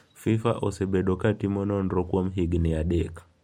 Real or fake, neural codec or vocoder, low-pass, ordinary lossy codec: real; none; 19.8 kHz; MP3, 64 kbps